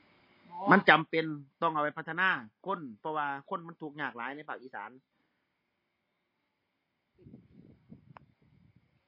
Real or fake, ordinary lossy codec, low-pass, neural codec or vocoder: real; MP3, 24 kbps; 5.4 kHz; none